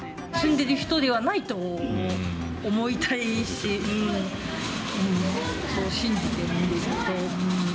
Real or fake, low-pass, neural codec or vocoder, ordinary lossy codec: real; none; none; none